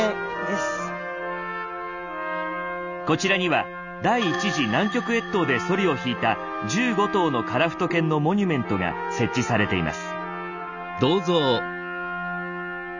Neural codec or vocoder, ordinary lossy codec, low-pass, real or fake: none; none; 7.2 kHz; real